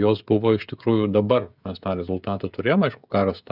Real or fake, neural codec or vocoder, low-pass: fake; codec, 16 kHz, 16 kbps, FreqCodec, smaller model; 5.4 kHz